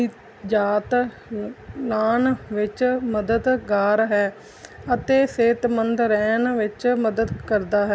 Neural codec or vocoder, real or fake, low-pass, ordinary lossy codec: none; real; none; none